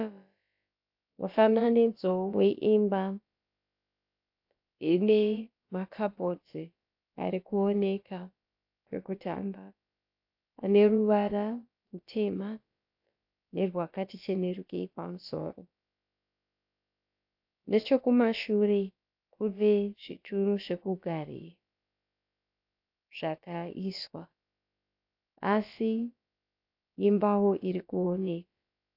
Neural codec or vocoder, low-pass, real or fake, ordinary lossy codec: codec, 16 kHz, about 1 kbps, DyCAST, with the encoder's durations; 5.4 kHz; fake; AAC, 48 kbps